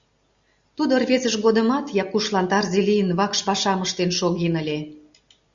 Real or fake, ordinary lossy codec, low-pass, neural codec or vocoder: real; Opus, 64 kbps; 7.2 kHz; none